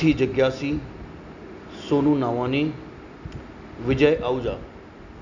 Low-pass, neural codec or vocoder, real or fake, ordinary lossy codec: 7.2 kHz; none; real; none